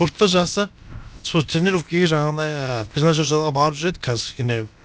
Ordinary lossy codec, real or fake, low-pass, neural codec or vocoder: none; fake; none; codec, 16 kHz, about 1 kbps, DyCAST, with the encoder's durations